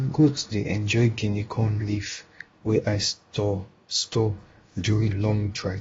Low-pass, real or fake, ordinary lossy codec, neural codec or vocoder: 7.2 kHz; fake; AAC, 24 kbps; codec, 16 kHz, about 1 kbps, DyCAST, with the encoder's durations